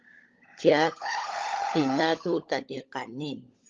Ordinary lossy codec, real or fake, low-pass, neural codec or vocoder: Opus, 32 kbps; fake; 7.2 kHz; codec, 16 kHz, 16 kbps, FunCodec, trained on LibriTTS, 50 frames a second